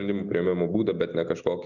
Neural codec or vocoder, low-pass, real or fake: none; 7.2 kHz; real